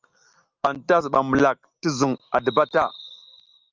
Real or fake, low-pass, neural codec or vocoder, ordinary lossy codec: real; 7.2 kHz; none; Opus, 24 kbps